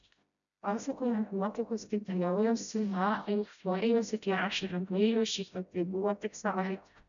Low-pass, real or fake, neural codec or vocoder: 7.2 kHz; fake; codec, 16 kHz, 0.5 kbps, FreqCodec, smaller model